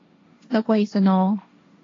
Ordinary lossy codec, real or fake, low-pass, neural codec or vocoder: AAC, 32 kbps; fake; 7.2 kHz; codec, 16 kHz, 1.1 kbps, Voila-Tokenizer